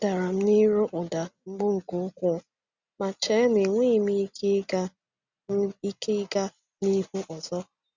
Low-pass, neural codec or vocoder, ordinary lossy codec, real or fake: 7.2 kHz; none; Opus, 64 kbps; real